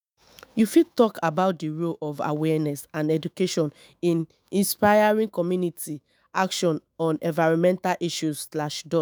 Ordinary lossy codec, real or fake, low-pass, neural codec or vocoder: none; fake; none; autoencoder, 48 kHz, 128 numbers a frame, DAC-VAE, trained on Japanese speech